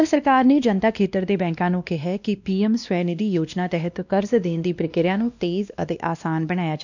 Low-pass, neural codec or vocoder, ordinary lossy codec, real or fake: 7.2 kHz; codec, 16 kHz, 1 kbps, X-Codec, WavLM features, trained on Multilingual LibriSpeech; none; fake